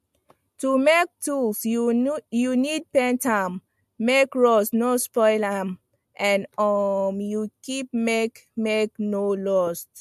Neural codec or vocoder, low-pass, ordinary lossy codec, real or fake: none; 14.4 kHz; MP3, 64 kbps; real